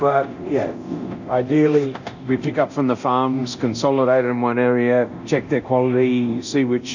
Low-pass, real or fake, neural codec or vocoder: 7.2 kHz; fake; codec, 24 kHz, 0.9 kbps, DualCodec